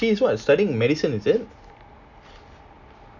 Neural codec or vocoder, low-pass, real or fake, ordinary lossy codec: none; 7.2 kHz; real; none